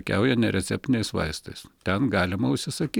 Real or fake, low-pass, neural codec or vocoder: real; 19.8 kHz; none